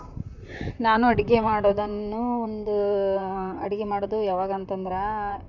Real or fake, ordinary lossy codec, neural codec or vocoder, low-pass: fake; none; vocoder, 44.1 kHz, 128 mel bands, Pupu-Vocoder; 7.2 kHz